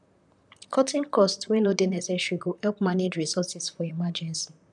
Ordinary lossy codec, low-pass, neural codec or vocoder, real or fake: none; 10.8 kHz; vocoder, 44.1 kHz, 128 mel bands, Pupu-Vocoder; fake